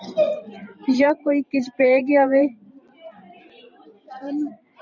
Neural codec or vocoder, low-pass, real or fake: vocoder, 44.1 kHz, 128 mel bands every 512 samples, BigVGAN v2; 7.2 kHz; fake